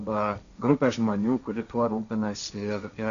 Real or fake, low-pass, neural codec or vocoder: fake; 7.2 kHz; codec, 16 kHz, 1.1 kbps, Voila-Tokenizer